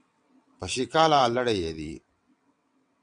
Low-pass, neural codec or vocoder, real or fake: 9.9 kHz; vocoder, 22.05 kHz, 80 mel bands, WaveNeXt; fake